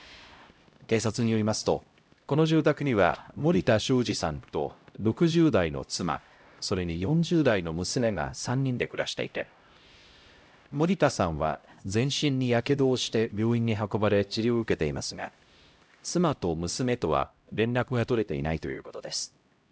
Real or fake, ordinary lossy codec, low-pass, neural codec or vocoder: fake; none; none; codec, 16 kHz, 0.5 kbps, X-Codec, HuBERT features, trained on LibriSpeech